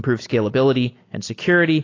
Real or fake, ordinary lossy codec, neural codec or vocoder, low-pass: real; AAC, 32 kbps; none; 7.2 kHz